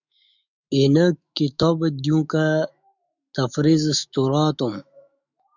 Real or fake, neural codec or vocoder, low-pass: fake; autoencoder, 48 kHz, 128 numbers a frame, DAC-VAE, trained on Japanese speech; 7.2 kHz